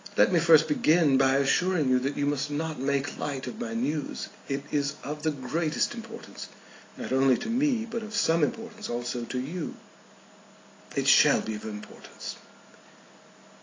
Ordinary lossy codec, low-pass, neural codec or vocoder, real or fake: AAC, 32 kbps; 7.2 kHz; none; real